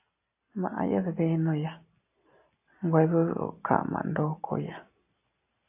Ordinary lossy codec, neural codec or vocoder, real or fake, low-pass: AAC, 32 kbps; none; real; 3.6 kHz